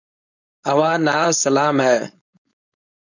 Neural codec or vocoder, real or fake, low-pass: codec, 16 kHz, 4.8 kbps, FACodec; fake; 7.2 kHz